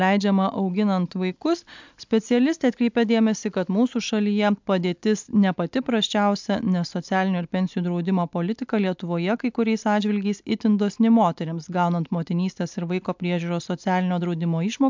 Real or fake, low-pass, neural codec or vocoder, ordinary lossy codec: real; 7.2 kHz; none; MP3, 64 kbps